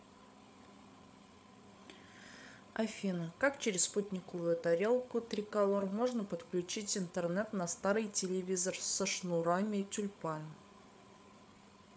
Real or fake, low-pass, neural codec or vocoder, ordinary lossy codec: fake; none; codec, 16 kHz, 8 kbps, FreqCodec, larger model; none